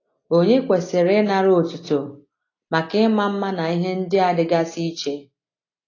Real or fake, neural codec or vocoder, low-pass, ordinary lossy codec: real; none; 7.2 kHz; AAC, 32 kbps